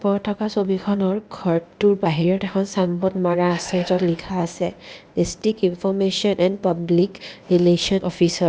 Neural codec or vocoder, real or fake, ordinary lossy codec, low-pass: codec, 16 kHz, 0.8 kbps, ZipCodec; fake; none; none